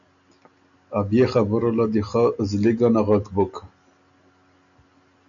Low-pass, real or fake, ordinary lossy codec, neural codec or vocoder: 7.2 kHz; real; MP3, 96 kbps; none